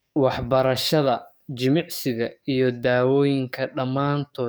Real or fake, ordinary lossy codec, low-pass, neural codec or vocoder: fake; none; none; codec, 44.1 kHz, 7.8 kbps, DAC